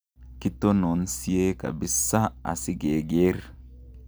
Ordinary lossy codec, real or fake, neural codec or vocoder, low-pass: none; real; none; none